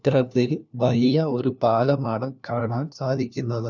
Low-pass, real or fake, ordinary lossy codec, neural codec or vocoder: 7.2 kHz; fake; none; codec, 16 kHz, 1 kbps, FunCodec, trained on LibriTTS, 50 frames a second